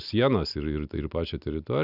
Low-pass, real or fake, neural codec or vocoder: 5.4 kHz; real; none